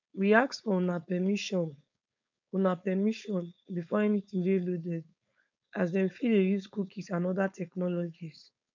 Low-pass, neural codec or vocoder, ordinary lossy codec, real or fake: 7.2 kHz; codec, 16 kHz, 4.8 kbps, FACodec; MP3, 64 kbps; fake